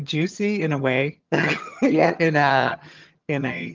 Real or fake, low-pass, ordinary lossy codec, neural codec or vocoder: fake; 7.2 kHz; Opus, 24 kbps; vocoder, 22.05 kHz, 80 mel bands, HiFi-GAN